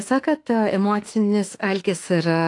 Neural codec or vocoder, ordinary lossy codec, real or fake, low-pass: autoencoder, 48 kHz, 32 numbers a frame, DAC-VAE, trained on Japanese speech; AAC, 48 kbps; fake; 10.8 kHz